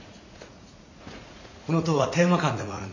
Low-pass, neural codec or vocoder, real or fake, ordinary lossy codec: 7.2 kHz; vocoder, 44.1 kHz, 80 mel bands, Vocos; fake; none